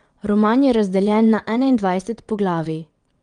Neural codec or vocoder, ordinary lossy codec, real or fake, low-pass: vocoder, 22.05 kHz, 80 mel bands, WaveNeXt; Opus, 32 kbps; fake; 9.9 kHz